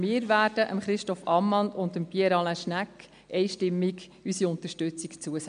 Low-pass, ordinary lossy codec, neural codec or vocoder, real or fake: 9.9 kHz; MP3, 64 kbps; none; real